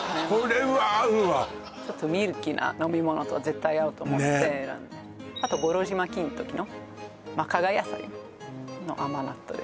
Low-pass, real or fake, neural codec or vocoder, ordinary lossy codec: none; real; none; none